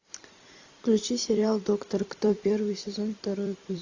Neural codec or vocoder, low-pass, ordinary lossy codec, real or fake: vocoder, 44.1 kHz, 128 mel bands every 256 samples, BigVGAN v2; 7.2 kHz; AAC, 48 kbps; fake